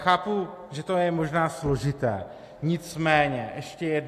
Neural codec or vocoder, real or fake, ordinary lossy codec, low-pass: none; real; AAC, 64 kbps; 14.4 kHz